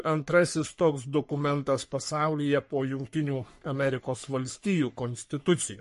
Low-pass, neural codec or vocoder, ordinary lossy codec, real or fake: 14.4 kHz; codec, 44.1 kHz, 3.4 kbps, Pupu-Codec; MP3, 48 kbps; fake